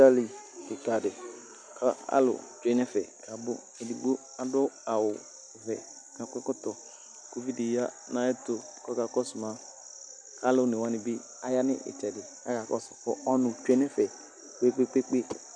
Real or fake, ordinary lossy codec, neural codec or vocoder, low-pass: real; MP3, 96 kbps; none; 9.9 kHz